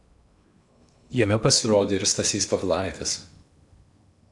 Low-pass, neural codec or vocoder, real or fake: 10.8 kHz; codec, 16 kHz in and 24 kHz out, 0.8 kbps, FocalCodec, streaming, 65536 codes; fake